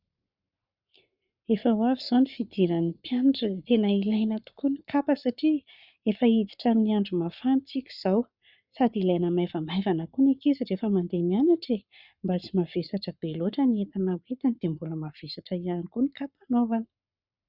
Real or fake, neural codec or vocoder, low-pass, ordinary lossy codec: fake; codec, 44.1 kHz, 7.8 kbps, Pupu-Codec; 5.4 kHz; Opus, 64 kbps